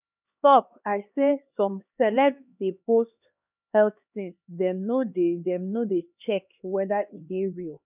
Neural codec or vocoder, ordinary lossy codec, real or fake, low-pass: codec, 16 kHz, 2 kbps, X-Codec, HuBERT features, trained on LibriSpeech; none; fake; 3.6 kHz